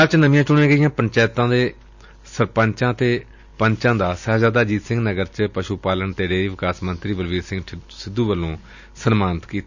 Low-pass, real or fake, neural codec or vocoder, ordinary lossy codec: 7.2 kHz; real; none; none